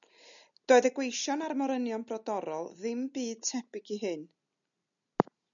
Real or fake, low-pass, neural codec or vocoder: real; 7.2 kHz; none